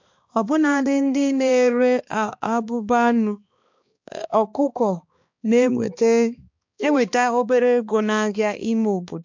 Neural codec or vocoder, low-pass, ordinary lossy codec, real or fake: codec, 16 kHz, 2 kbps, X-Codec, HuBERT features, trained on balanced general audio; 7.2 kHz; MP3, 48 kbps; fake